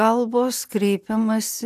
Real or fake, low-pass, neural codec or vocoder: real; 14.4 kHz; none